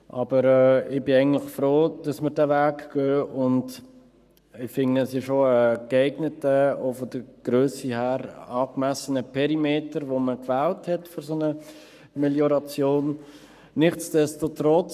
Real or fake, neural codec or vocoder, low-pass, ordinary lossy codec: fake; codec, 44.1 kHz, 7.8 kbps, Pupu-Codec; 14.4 kHz; none